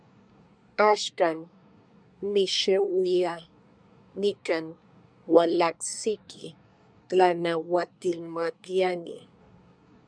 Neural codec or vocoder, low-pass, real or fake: codec, 24 kHz, 1 kbps, SNAC; 9.9 kHz; fake